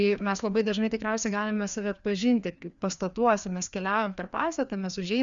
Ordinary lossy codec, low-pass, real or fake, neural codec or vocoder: Opus, 64 kbps; 7.2 kHz; fake; codec, 16 kHz, 2 kbps, FreqCodec, larger model